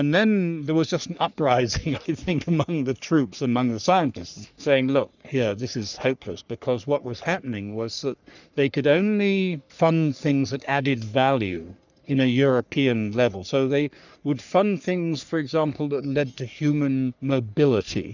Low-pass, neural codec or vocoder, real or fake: 7.2 kHz; codec, 44.1 kHz, 3.4 kbps, Pupu-Codec; fake